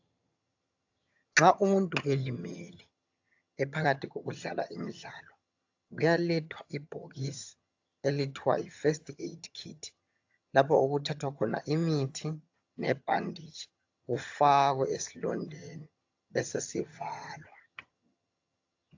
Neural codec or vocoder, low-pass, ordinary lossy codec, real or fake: vocoder, 22.05 kHz, 80 mel bands, HiFi-GAN; 7.2 kHz; AAC, 48 kbps; fake